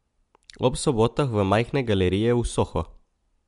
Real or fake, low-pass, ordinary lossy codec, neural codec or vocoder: real; 10.8 kHz; MP3, 64 kbps; none